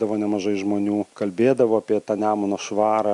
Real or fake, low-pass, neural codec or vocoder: real; 10.8 kHz; none